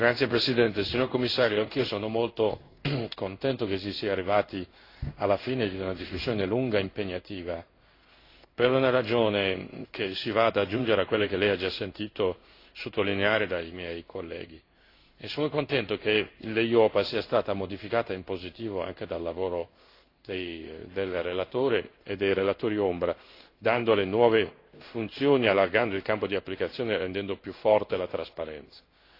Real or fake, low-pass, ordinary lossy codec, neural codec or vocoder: fake; 5.4 kHz; AAC, 32 kbps; codec, 16 kHz in and 24 kHz out, 1 kbps, XY-Tokenizer